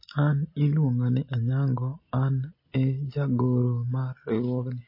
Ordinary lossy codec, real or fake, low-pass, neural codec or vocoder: MP3, 24 kbps; real; 5.4 kHz; none